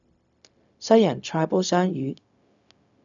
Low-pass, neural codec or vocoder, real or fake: 7.2 kHz; codec, 16 kHz, 0.4 kbps, LongCat-Audio-Codec; fake